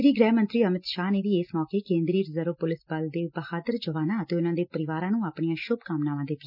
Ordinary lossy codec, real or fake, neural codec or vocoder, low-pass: none; real; none; 5.4 kHz